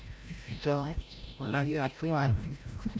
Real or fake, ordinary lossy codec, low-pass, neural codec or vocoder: fake; none; none; codec, 16 kHz, 0.5 kbps, FreqCodec, larger model